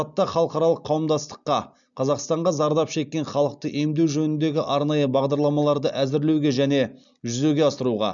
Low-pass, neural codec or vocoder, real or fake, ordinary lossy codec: 7.2 kHz; none; real; none